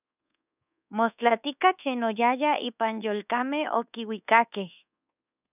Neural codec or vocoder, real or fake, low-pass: codec, 24 kHz, 1.2 kbps, DualCodec; fake; 3.6 kHz